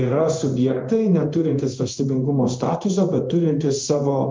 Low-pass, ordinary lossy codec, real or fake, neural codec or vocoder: 7.2 kHz; Opus, 24 kbps; fake; codec, 16 kHz in and 24 kHz out, 1 kbps, XY-Tokenizer